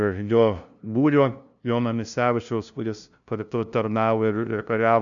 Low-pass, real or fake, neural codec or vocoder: 7.2 kHz; fake; codec, 16 kHz, 0.5 kbps, FunCodec, trained on LibriTTS, 25 frames a second